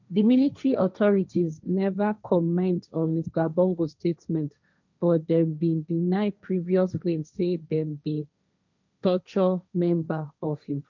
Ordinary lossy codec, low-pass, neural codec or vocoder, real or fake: none; 7.2 kHz; codec, 16 kHz, 1.1 kbps, Voila-Tokenizer; fake